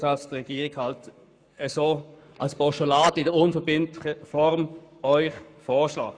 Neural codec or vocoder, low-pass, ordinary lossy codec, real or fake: codec, 44.1 kHz, 7.8 kbps, Pupu-Codec; 9.9 kHz; none; fake